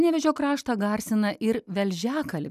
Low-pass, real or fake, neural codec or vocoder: 14.4 kHz; real; none